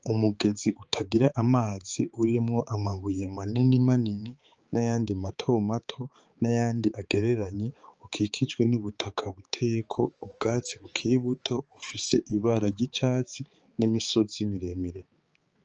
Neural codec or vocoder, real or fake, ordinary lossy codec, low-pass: codec, 16 kHz, 4 kbps, X-Codec, HuBERT features, trained on balanced general audio; fake; Opus, 24 kbps; 7.2 kHz